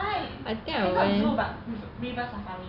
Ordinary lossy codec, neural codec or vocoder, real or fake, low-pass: none; none; real; 5.4 kHz